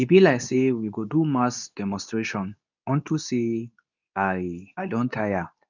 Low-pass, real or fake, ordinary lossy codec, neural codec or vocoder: 7.2 kHz; fake; none; codec, 24 kHz, 0.9 kbps, WavTokenizer, medium speech release version 2